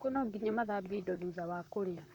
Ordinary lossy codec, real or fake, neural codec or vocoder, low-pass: none; fake; vocoder, 44.1 kHz, 128 mel bands, Pupu-Vocoder; 19.8 kHz